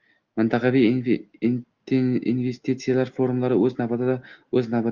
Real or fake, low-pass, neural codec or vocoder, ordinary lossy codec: real; 7.2 kHz; none; Opus, 32 kbps